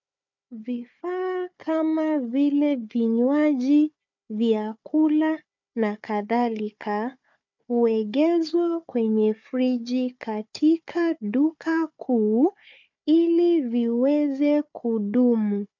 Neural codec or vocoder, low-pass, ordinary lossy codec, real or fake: codec, 16 kHz, 4 kbps, FunCodec, trained on Chinese and English, 50 frames a second; 7.2 kHz; MP3, 64 kbps; fake